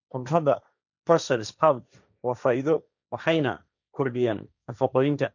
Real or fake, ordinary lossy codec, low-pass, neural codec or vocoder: fake; none; 7.2 kHz; codec, 16 kHz, 1.1 kbps, Voila-Tokenizer